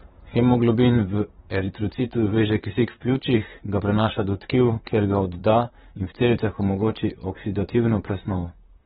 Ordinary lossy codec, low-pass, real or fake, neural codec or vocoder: AAC, 16 kbps; 19.8 kHz; fake; vocoder, 44.1 kHz, 128 mel bands, Pupu-Vocoder